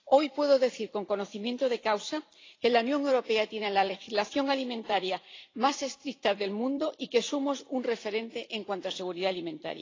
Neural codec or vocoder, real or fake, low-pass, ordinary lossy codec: vocoder, 44.1 kHz, 128 mel bands every 256 samples, BigVGAN v2; fake; 7.2 kHz; AAC, 32 kbps